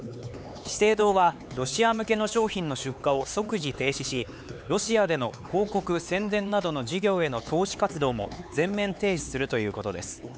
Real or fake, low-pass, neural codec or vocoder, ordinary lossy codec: fake; none; codec, 16 kHz, 4 kbps, X-Codec, HuBERT features, trained on LibriSpeech; none